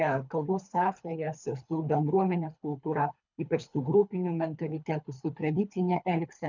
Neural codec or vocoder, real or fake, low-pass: codec, 24 kHz, 3 kbps, HILCodec; fake; 7.2 kHz